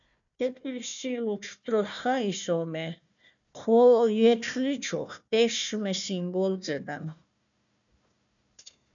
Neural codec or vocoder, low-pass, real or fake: codec, 16 kHz, 1 kbps, FunCodec, trained on Chinese and English, 50 frames a second; 7.2 kHz; fake